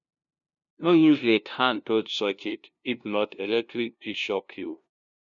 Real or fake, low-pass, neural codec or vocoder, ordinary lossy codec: fake; 7.2 kHz; codec, 16 kHz, 0.5 kbps, FunCodec, trained on LibriTTS, 25 frames a second; none